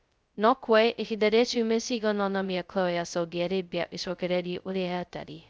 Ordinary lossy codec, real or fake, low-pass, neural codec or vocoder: none; fake; none; codec, 16 kHz, 0.2 kbps, FocalCodec